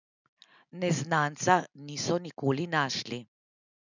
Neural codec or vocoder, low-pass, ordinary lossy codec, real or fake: none; 7.2 kHz; none; real